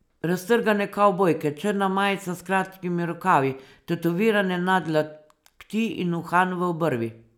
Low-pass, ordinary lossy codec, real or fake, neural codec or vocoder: 19.8 kHz; none; real; none